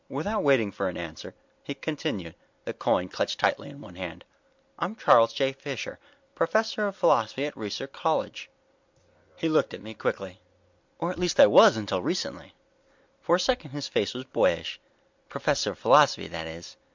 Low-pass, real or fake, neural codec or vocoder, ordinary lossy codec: 7.2 kHz; real; none; MP3, 64 kbps